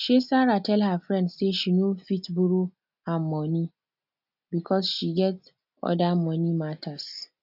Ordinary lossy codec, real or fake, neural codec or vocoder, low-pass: none; real; none; 5.4 kHz